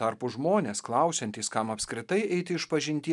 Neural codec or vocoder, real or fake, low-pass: none; real; 10.8 kHz